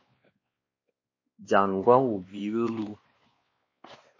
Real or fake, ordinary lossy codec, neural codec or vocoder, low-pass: fake; MP3, 32 kbps; codec, 16 kHz, 2 kbps, X-Codec, WavLM features, trained on Multilingual LibriSpeech; 7.2 kHz